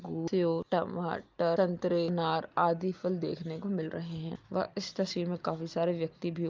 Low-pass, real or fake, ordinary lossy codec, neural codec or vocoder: 7.2 kHz; real; Opus, 24 kbps; none